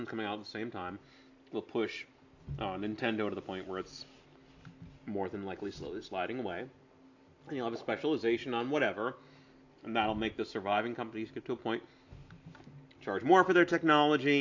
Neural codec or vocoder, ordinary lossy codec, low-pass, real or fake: none; AAC, 48 kbps; 7.2 kHz; real